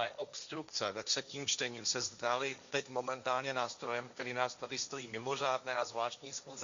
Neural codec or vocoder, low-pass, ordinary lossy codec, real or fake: codec, 16 kHz, 1.1 kbps, Voila-Tokenizer; 7.2 kHz; Opus, 64 kbps; fake